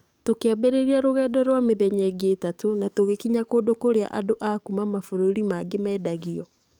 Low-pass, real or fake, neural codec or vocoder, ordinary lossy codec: 19.8 kHz; fake; codec, 44.1 kHz, 7.8 kbps, DAC; none